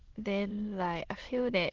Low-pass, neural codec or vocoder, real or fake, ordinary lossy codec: 7.2 kHz; autoencoder, 22.05 kHz, a latent of 192 numbers a frame, VITS, trained on many speakers; fake; Opus, 16 kbps